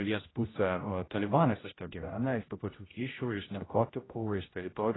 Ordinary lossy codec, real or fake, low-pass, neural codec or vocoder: AAC, 16 kbps; fake; 7.2 kHz; codec, 16 kHz, 0.5 kbps, X-Codec, HuBERT features, trained on general audio